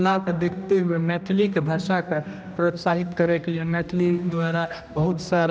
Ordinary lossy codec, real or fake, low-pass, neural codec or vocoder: none; fake; none; codec, 16 kHz, 1 kbps, X-Codec, HuBERT features, trained on general audio